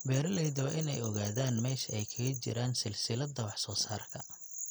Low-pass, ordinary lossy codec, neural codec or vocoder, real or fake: none; none; none; real